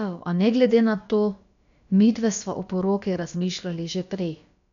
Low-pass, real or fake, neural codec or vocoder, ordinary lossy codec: 7.2 kHz; fake; codec, 16 kHz, about 1 kbps, DyCAST, with the encoder's durations; none